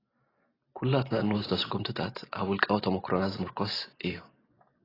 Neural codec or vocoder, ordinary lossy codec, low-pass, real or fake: none; AAC, 24 kbps; 5.4 kHz; real